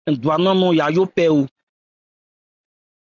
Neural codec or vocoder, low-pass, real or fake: none; 7.2 kHz; real